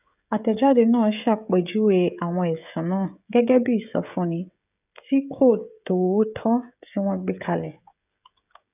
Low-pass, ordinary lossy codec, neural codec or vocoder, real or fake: 3.6 kHz; none; codec, 16 kHz, 16 kbps, FreqCodec, smaller model; fake